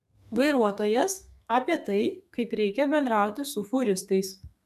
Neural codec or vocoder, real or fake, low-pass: codec, 32 kHz, 1.9 kbps, SNAC; fake; 14.4 kHz